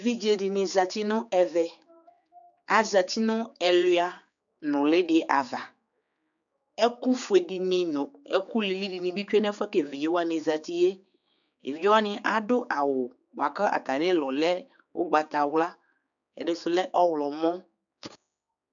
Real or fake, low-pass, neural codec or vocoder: fake; 7.2 kHz; codec, 16 kHz, 4 kbps, X-Codec, HuBERT features, trained on general audio